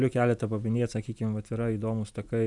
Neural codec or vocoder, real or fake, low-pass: none; real; 10.8 kHz